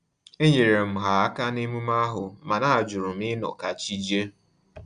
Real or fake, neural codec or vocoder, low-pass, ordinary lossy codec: real; none; 9.9 kHz; Opus, 64 kbps